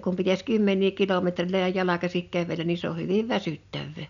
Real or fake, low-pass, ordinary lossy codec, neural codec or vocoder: real; 7.2 kHz; none; none